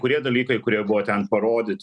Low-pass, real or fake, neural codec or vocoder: 10.8 kHz; real; none